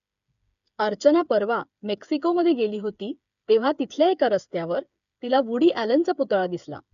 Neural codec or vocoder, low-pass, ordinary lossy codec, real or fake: codec, 16 kHz, 8 kbps, FreqCodec, smaller model; 7.2 kHz; none; fake